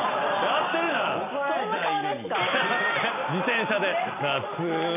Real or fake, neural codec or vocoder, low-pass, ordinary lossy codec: real; none; 3.6 kHz; none